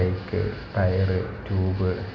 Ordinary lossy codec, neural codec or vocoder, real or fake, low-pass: none; none; real; none